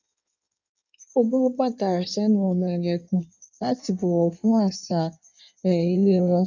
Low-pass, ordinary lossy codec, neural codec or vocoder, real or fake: 7.2 kHz; none; codec, 16 kHz in and 24 kHz out, 1.1 kbps, FireRedTTS-2 codec; fake